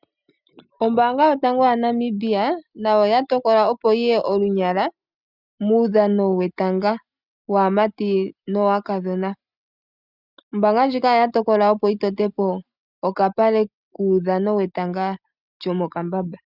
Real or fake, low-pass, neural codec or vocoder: real; 5.4 kHz; none